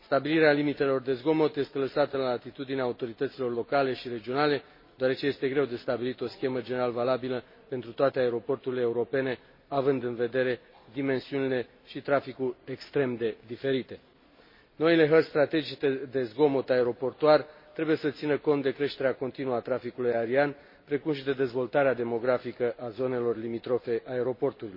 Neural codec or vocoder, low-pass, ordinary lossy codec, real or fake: none; 5.4 kHz; MP3, 24 kbps; real